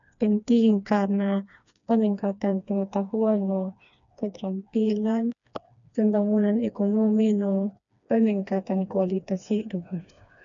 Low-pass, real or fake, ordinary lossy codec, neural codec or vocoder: 7.2 kHz; fake; none; codec, 16 kHz, 2 kbps, FreqCodec, smaller model